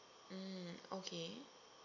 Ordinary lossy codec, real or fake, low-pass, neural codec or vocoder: none; real; 7.2 kHz; none